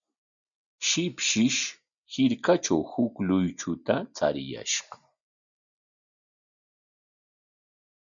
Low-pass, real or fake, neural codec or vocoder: 7.2 kHz; real; none